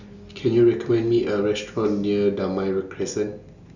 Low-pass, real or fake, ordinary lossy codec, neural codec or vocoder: 7.2 kHz; real; none; none